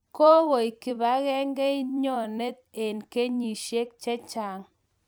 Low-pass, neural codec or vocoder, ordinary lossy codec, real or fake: none; vocoder, 44.1 kHz, 128 mel bands every 256 samples, BigVGAN v2; none; fake